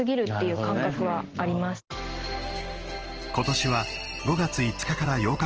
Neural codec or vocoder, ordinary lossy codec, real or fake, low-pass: none; Opus, 16 kbps; real; 7.2 kHz